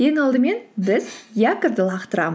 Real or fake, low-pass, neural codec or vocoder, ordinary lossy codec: real; none; none; none